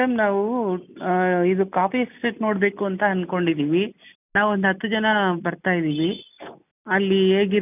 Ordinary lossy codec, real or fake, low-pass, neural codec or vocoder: none; real; 3.6 kHz; none